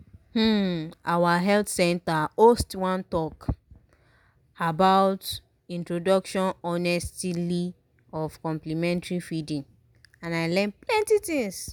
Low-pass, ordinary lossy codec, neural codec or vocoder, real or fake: none; none; none; real